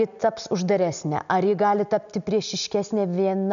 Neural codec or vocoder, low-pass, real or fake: none; 7.2 kHz; real